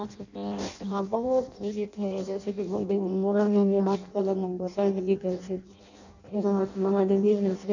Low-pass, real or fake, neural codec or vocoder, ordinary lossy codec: 7.2 kHz; fake; codec, 16 kHz in and 24 kHz out, 0.6 kbps, FireRedTTS-2 codec; none